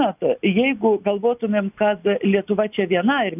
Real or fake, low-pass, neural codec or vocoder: real; 3.6 kHz; none